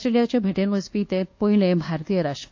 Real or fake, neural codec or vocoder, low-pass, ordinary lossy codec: fake; codec, 24 kHz, 1.2 kbps, DualCodec; 7.2 kHz; AAC, 48 kbps